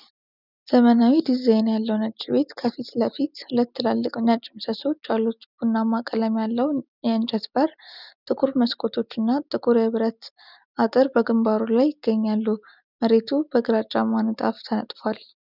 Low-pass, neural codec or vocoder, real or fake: 5.4 kHz; none; real